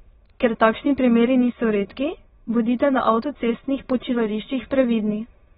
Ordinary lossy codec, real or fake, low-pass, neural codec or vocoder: AAC, 16 kbps; fake; 9.9 kHz; autoencoder, 22.05 kHz, a latent of 192 numbers a frame, VITS, trained on many speakers